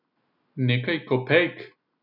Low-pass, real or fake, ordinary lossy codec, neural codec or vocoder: 5.4 kHz; real; none; none